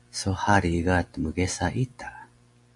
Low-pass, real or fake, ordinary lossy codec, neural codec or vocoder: 10.8 kHz; real; MP3, 64 kbps; none